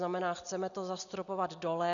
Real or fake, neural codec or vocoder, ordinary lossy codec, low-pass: real; none; MP3, 96 kbps; 7.2 kHz